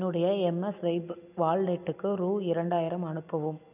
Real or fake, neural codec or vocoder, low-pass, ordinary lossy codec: real; none; 3.6 kHz; none